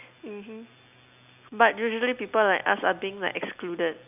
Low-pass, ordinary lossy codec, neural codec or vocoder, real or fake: 3.6 kHz; none; none; real